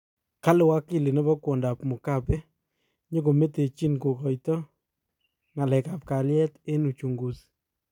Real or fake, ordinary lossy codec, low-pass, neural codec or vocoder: fake; none; 19.8 kHz; vocoder, 44.1 kHz, 128 mel bands every 256 samples, BigVGAN v2